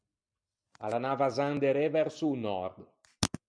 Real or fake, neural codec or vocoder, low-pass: real; none; 9.9 kHz